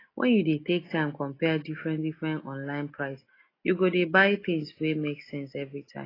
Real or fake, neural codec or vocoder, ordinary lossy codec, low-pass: real; none; AAC, 24 kbps; 5.4 kHz